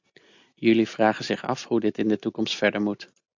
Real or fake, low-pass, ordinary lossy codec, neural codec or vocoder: real; 7.2 kHz; AAC, 48 kbps; none